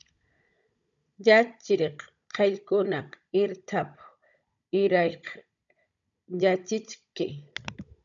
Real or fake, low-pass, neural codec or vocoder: fake; 7.2 kHz; codec, 16 kHz, 16 kbps, FunCodec, trained on Chinese and English, 50 frames a second